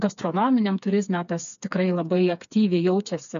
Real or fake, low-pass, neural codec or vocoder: fake; 7.2 kHz; codec, 16 kHz, 4 kbps, FreqCodec, smaller model